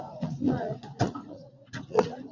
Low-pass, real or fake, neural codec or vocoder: 7.2 kHz; fake; vocoder, 44.1 kHz, 80 mel bands, Vocos